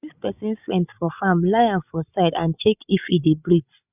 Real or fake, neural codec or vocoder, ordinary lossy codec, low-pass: fake; codec, 16 kHz, 16 kbps, FreqCodec, larger model; none; 3.6 kHz